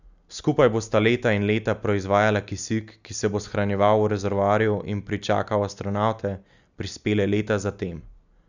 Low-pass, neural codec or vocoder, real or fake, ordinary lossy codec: 7.2 kHz; none; real; none